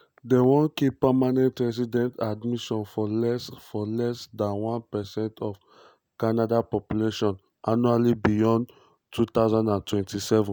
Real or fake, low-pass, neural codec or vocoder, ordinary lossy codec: real; none; none; none